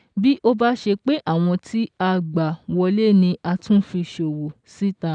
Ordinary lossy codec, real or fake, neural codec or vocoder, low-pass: none; real; none; 9.9 kHz